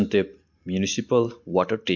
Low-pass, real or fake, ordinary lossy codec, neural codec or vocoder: 7.2 kHz; real; MP3, 48 kbps; none